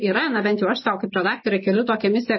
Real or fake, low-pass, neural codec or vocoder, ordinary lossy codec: real; 7.2 kHz; none; MP3, 24 kbps